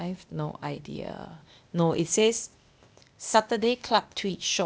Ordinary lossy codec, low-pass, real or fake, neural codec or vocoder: none; none; fake; codec, 16 kHz, 0.8 kbps, ZipCodec